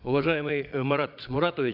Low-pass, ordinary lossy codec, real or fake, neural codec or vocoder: 5.4 kHz; none; fake; vocoder, 22.05 kHz, 80 mel bands, Vocos